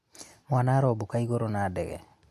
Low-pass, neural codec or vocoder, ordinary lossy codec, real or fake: 14.4 kHz; none; MP3, 64 kbps; real